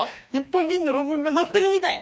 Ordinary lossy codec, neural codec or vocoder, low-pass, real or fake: none; codec, 16 kHz, 1 kbps, FreqCodec, larger model; none; fake